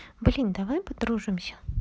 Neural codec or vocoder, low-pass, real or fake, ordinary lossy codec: none; none; real; none